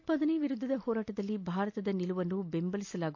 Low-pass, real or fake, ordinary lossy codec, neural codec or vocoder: 7.2 kHz; real; AAC, 48 kbps; none